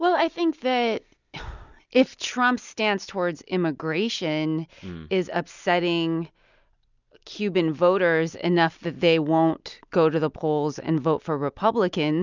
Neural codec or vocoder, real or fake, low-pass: none; real; 7.2 kHz